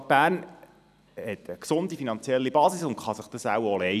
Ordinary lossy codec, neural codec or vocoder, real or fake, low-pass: none; vocoder, 44.1 kHz, 128 mel bands every 256 samples, BigVGAN v2; fake; 14.4 kHz